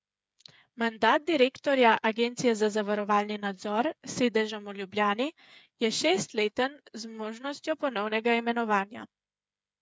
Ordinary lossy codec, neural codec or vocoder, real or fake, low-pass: none; codec, 16 kHz, 8 kbps, FreqCodec, smaller model; fake; none